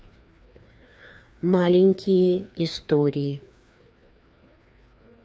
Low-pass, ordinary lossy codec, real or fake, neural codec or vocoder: none; none; fake; codec, 16 kHz, 2 kbps, FreqCodec, larger model